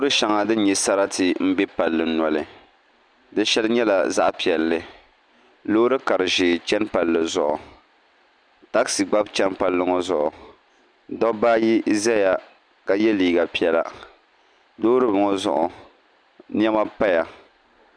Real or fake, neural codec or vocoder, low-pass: real; none; 9.9 kHz